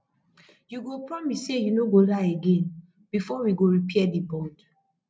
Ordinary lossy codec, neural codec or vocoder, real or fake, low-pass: none; none; real; none